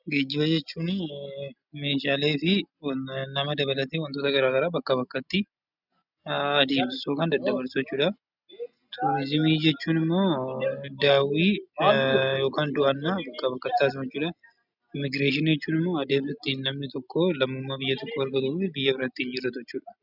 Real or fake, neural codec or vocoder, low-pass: real; none; 5.4 kHz